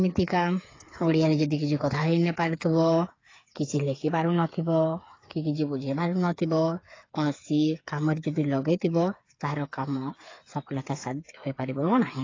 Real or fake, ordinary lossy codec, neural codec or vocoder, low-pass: fake; AAC, 32 kbps; codec, 16 kHz, 8 kbps, FreqCodec, smaller model; 7.2 kHz